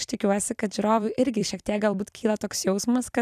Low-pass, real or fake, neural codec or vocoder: 14.4 kHz; fake; vocoder, 44.1 kHz, 128 mel bands every 256 samples, BigVGAN v2